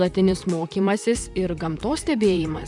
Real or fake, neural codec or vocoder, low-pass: fake; vocoder, 44.1 kHz, 128 mel bands, Pupu-Vocoder; 10.8 kHz